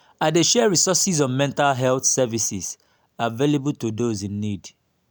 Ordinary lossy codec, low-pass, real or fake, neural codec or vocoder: none; none; real; none